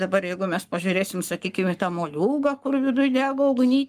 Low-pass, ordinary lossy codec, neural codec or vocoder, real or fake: 14.4 kHz; Opus, 32 kbps; codec, 44.1 kHz, 7.8 kbps, Pupu-Codec; fake